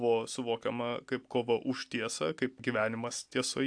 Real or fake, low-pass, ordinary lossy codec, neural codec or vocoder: real; 9.9 kHz; AAC, 64 kbps; none